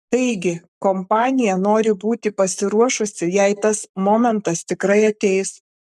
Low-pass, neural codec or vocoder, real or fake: 14.4 kHz; codec, 44.1 kHz, 7.8 kbps, Pupu-Codec; fake